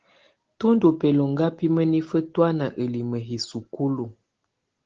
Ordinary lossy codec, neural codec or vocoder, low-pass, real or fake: Opus, 16 kbps; none; 7.2 kHz; real